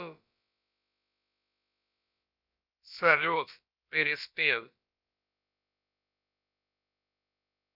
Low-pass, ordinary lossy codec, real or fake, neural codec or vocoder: 5.4 kHz; none; fake; codec, 16 kHz, about 1 kbps, DyCAST, with the encoder's durations